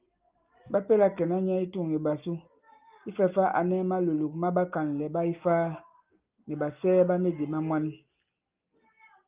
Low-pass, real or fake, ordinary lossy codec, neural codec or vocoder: 3.6 kHz; real; Opus, 24 kbps; none